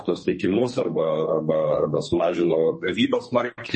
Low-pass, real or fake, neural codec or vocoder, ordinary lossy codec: 10.8 kHz; fake; codec, 32 kHz, 1.9 kbps, SNAC; MP3, 32 kbps